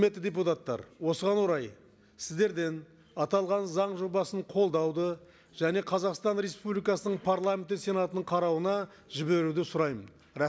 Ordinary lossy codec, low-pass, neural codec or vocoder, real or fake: none; none; none; real